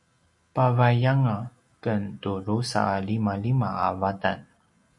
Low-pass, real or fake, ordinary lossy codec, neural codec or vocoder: 10.8 kHz; real; MP3, 96 kbps; none